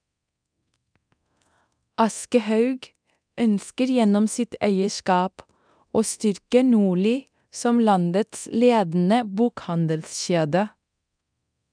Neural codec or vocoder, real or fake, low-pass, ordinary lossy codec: codec, 24 kHz, 0.9 kbps, DualCodec; fake; 9.9 kHz; none